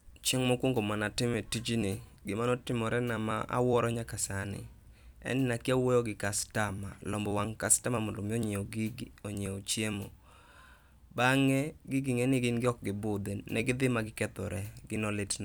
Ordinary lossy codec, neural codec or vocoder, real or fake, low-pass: none; vocoder, 44.1 kHz, 128 mel bands every 256 samples, BigVGAN v2; fake; none